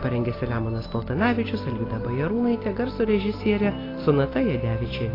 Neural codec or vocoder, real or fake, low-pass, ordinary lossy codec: none; real; 5.4 kHz; AAC, 24 kbps